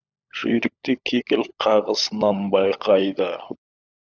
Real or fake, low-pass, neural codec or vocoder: fake; 7.2 kHz; codec, 16 kHz, 16 kbps, FunCodec, trained on LibriTTS, 50 frames a second